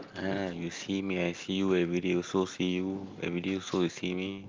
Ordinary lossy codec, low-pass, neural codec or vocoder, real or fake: Opus, 32 kbps; 7.2 kHz; none; real